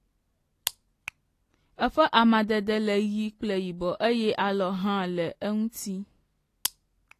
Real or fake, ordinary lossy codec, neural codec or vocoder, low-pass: real; AAC, 48 kbps; none; 14.4 kHz